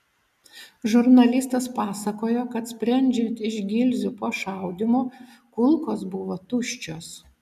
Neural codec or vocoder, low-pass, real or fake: none; 14.4 kHz; real